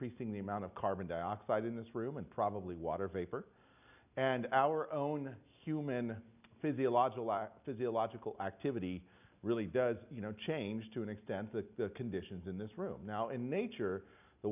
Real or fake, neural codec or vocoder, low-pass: real; none; 3.6 kHz